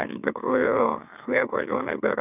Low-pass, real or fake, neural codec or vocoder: 3.6 kHz; fake; autoencoder, 44.1 kHz, a latent of 192 numbers a frame, MeloTTS